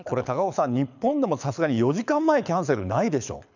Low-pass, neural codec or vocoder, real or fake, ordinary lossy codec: 7.2 kHz; codec, 24 kHz, 6 kbps, HILCodec; fake; none